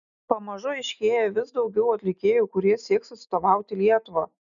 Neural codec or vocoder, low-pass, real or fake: none; 7.2 kHz; real